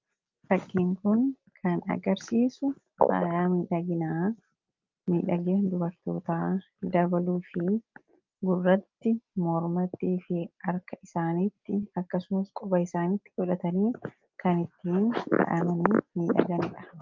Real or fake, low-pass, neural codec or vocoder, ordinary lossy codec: real; 7.2 kHz; none; Opus, 24 kbps